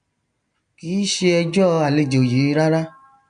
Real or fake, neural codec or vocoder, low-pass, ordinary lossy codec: real; none; 9.9 kHz; none